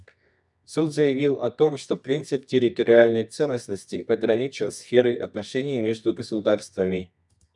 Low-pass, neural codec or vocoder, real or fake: 10.8 kHz; codec, 24 kHz, 0.9 kbps, WavTokenizer, medium music audio release; fake